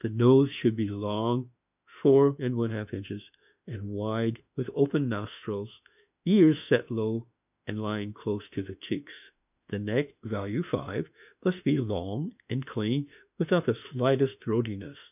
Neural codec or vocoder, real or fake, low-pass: autoencoder, 48 kHz, 32 numbers a frame, DAC-VAE, trained on Japanese speech; fake; 3.6 kHz